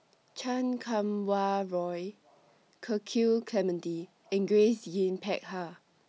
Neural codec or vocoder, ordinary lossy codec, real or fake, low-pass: none; none; real; none